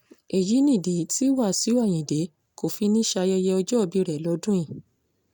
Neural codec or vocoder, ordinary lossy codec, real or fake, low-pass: none; none; real; none